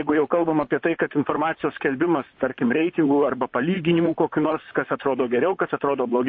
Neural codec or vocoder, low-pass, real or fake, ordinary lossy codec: vocoder, 44.1 kHz, 128 mel bands, Pupu-Vocoder; 7.2 kHz; fake; MP3, 32 kbps